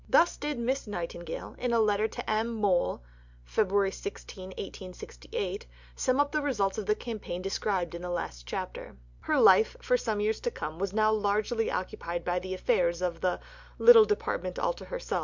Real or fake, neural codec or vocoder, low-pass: real; none; 7.2 kHz